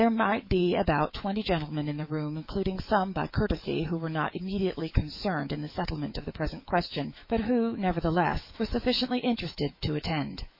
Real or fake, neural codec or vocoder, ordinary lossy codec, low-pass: fake; autoencoder, 48 kHz, 128 numbers a frame, DAC-VAE, trained on Japanese speech; MP3, 32 kbps; 5.4 kHz